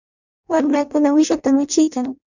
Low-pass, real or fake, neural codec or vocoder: 7.2 kHz; fake; codec, 16 kHz in and 24 kHz out, 0.6 kbps, FireRedTTS-2 codec